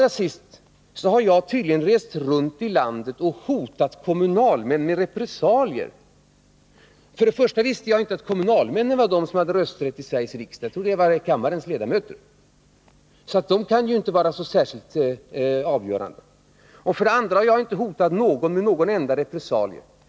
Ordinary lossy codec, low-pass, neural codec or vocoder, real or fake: none; none; none; real